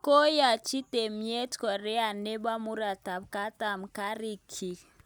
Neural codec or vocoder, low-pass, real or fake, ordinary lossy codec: none; none; real; none